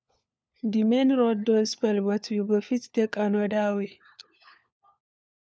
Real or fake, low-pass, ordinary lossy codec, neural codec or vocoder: fake; none; none; codec, 16 kHz, 4 kbps, FunCodec, trained on LibriTTS, 50 frames a second